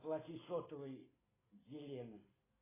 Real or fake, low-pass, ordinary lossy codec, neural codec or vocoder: real; 3.6 kHz; AAC, 16 kbps; none